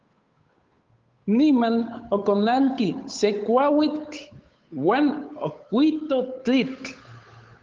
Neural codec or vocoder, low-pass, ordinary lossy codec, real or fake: codec, 16 kHz, 8 kbps, FunCodec, trained on Chinese and English, 25 frames a second; 7.2 kHz; Opus, 32 kbps; fake